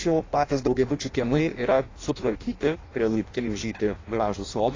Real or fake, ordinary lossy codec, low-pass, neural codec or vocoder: fake; AAC, 32 kbps; 7.2 kHz; codec, 16 kHz in and 24 kHz out, 0.6 kbps, FireRedTTS-2 codec